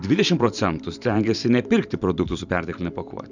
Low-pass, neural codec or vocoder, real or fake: 7.2 kHz; vocoder, 44.1 kHz, 128 mel bands every 512 samples, BigVGAN v2; fake